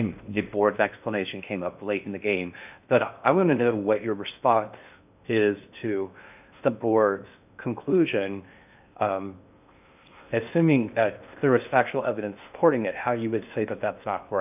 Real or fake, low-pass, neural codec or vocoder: fake; 3.6 kHz; codec, 16 kHz in and 24 kHz out, 0.6 kbps, FocalCodec, streaming, 4096 codes